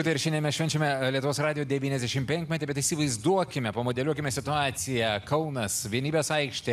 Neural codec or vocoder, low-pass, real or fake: none; 14.4 kHz; real